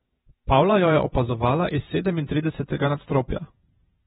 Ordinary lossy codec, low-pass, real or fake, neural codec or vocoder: AAC, 16 kbps; 19.8 kHz; fake; vocoder, 48 kHz, 128 mel bands, Vocos